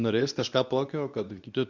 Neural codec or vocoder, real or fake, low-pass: codec, 24 kHz, 0.9 kbps, WavTokenizer, medium speech release version 2; fake; 7.2 kHz